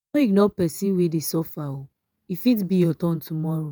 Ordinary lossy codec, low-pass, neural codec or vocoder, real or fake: none; none; vocoder, 48 kHz, 128 mel bands, Vocos; fake